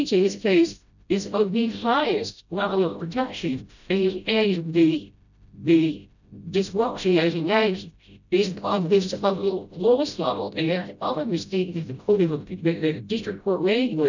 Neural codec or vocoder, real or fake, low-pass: codec, 16 kHz, 0.5 kbps, FreqCodec, smaller model; fake; 7.2 kHz